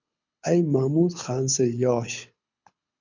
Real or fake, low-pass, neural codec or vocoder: fake; 7.2 kHz; codec, 24 kHz, 6 kbps, HILCodec